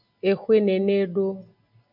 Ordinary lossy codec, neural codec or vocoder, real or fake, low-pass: MP3, 48 kbps; none; real; 5.4 kHz